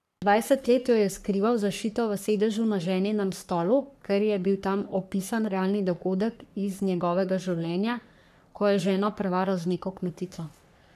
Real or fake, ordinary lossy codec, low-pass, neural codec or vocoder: fake; none; 14.4 kHz; codec, 44.1 kHz, 3.4 kbps, Pupu-Codec